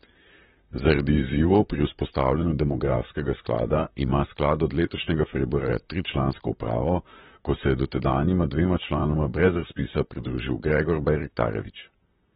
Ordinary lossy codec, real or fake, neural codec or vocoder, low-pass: AAC, 16 kbps; fake; vocoder, 44.1 kHz, 128 mel bands, Pupu-Vocoder; 19.8 kHz